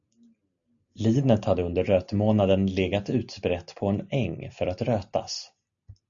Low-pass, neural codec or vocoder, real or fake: 7.2 kHz; none; real